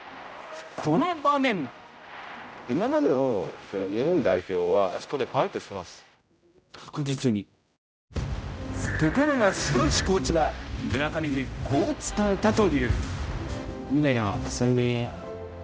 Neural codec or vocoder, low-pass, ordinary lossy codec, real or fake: codec, 16 kHz, 0.5 kbps, X-Codec, HuBERT features, trained on general audio; none; none; fake